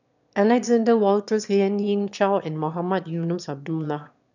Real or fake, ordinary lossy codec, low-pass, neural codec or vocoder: fake; none; 7.2 kHz; autoencoder, 22.05 kHz, a latent of 192 numbers a frame, VITS, trained on one speaker